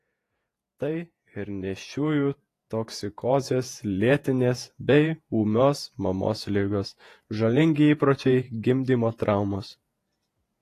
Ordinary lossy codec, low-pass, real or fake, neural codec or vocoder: AAC, 48 kbps; 14.4 kHz; fake; vocoder, 48 kHz, 128 mel bands, Vocos